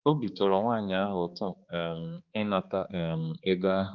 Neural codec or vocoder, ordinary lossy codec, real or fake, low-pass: codec, 16 kHz, 2 kbps, X-Codec, HuBERT features, trained on balanced general audio; Opus, 24 kbps; fake; 7.2 kHz